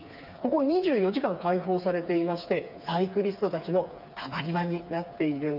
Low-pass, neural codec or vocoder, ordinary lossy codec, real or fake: 5.4 kHz; codec, 16 kHz, 4 kbps, FreqCodec, smaller model; none; fake